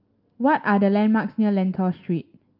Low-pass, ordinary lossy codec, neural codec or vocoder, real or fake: 5.4 kHz; Opus, 24 kbps; none; real